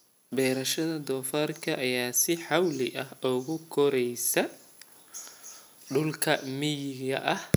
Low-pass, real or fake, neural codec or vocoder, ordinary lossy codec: none; real; none; none